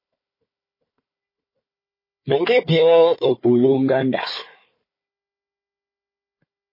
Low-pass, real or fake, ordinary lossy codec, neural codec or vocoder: 5.4 kHz; fake; MP3, 24 kbps; codec, 16 kHz, 4 kbps, FunCodec, trained on Chinese and English, 50 frames a second